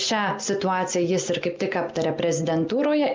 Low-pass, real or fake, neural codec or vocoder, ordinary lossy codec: 7.2 kHz; real; none; Opus, 24 kbps